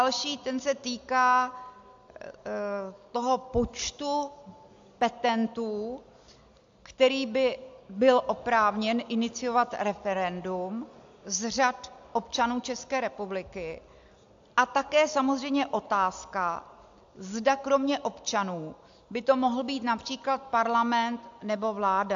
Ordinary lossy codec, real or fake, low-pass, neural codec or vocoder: AAC, 64 kbps; real; 7.2 kHz; none